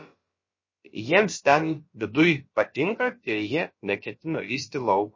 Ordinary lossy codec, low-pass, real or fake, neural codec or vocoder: MP3, 32 kbps; 7.2 kHz; fake; codec, 16 kHz, about 1 kbps, DyCAST, with the encoder's durations